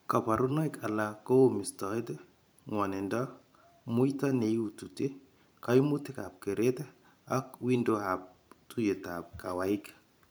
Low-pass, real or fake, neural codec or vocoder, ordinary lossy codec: none; fake; vocoder, 44.1 kHz, 128 mel bands every 512 samples, BigVGAN v2; none